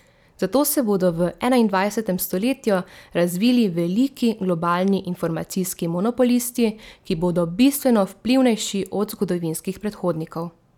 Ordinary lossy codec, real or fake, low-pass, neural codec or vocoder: none; real; 19.8 kHz; none